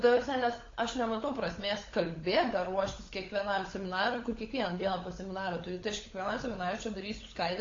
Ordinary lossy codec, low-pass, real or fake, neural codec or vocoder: AAC, 32 kbps; 7.2 kHz; fake; codec, 16 kHz, 16 kbps, FunCodec, trained on LibriTTS, 50 frames a second